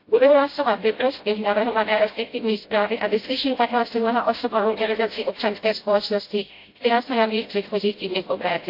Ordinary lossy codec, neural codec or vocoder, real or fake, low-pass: AAC, 32 kbps; codec, 16 kHz, 0.5 kbps, FreqCodec, smaller model; fake; 5.4 kHz